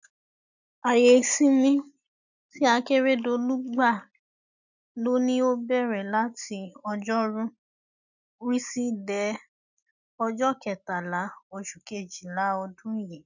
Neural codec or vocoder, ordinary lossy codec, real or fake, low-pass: none; none; real; 7.2 kHz